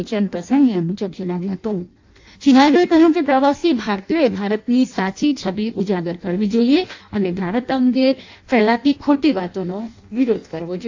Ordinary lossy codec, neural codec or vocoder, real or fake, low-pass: AAC, 48 kbps; codec, 16 kHz in and 24 kHz out, 0.6 kbps, FireRedTTS-2 codec; fake; 7.2 kHz